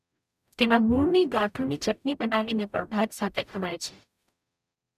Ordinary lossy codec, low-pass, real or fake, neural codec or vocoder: none; 14.4 kHz; fake; codec, 44.1 kHz, 0.9 kbps, DAC